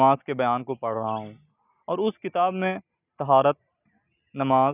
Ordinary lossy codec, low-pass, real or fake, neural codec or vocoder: none; 3.6 kHz; real; none